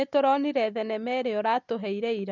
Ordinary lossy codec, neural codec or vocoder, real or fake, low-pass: none; none; real; 7.2 kHz